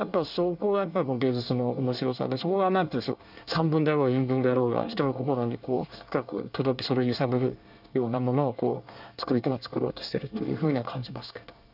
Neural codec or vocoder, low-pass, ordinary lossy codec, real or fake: codec, 24 kHz, 1 kbps, SNAC; 5.4 kHz; none; fake